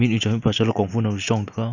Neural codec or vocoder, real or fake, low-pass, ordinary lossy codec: none; real; 7.2 kHz; none